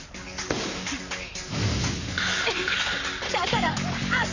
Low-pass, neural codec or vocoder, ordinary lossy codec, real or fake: 7.2 kHz; codec, 44.1 kHz, 7.8 kbps, Pupu-Codec; none; fake